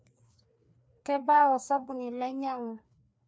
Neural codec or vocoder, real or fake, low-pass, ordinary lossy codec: codec, 16 kHz, 2 kbps, FreqCodec, larger model; fake; none; none